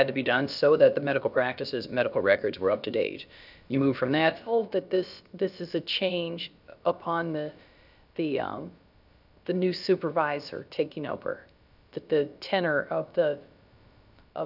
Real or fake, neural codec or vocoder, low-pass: fake; codec, 16 kHz, about 1 kbps, DyCAST, with the encoder's durations; 5.4 kHz